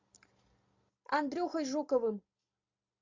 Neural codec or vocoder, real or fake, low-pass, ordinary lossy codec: none; real; 7.2 kHz; MP3, 48 kbps